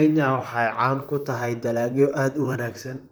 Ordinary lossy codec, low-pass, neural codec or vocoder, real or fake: none; none; vocoder, 44.1 kHz, 128 mel bands, Pupu-Vocoder; fake